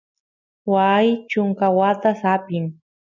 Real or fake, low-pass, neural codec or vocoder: real; 7.2 kHz; none